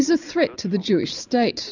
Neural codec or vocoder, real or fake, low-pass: none; real; 7.2 kHz